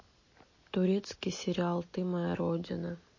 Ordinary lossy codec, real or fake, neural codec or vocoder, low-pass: MP3, 32 kbps; real; none; 7.2 kHz